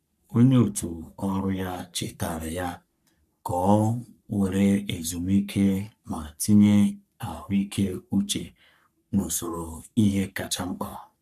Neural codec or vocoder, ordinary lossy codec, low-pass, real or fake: codec, 44.1 kHz, 3.4 kbps, Pupu-Codec; none; 14.4 kHz; fake